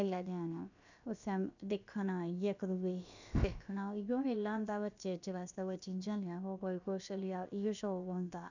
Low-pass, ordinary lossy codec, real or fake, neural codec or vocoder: 7.2 kHz; none; fake; codec, 16 kHz, about 1 kbps, DyCAST, with the encoder's durations